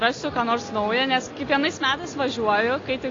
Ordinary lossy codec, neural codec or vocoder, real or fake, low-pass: AAC, 32 kbps; none; real; 7.2 kHz